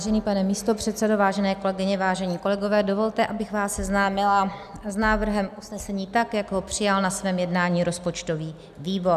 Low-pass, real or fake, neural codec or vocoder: 14.4 kHz; real; none